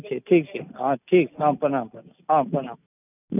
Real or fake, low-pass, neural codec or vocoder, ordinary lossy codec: real; 3.6 kHz; none; none